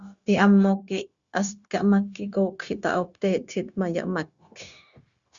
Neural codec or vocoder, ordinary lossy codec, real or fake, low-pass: codec, 16 kHz, 0.9 kbps, LongCat-Audio-Codec; Opus, 64 kbps; fake; 7.2 kHz